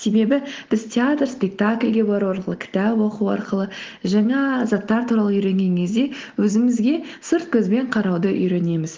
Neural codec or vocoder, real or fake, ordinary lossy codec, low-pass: none; real; Opus, 16 kbps; 7.2 kHz